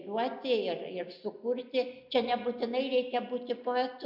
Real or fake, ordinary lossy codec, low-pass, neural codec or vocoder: real; MP3, 48 kbps; 5.4 kHz; none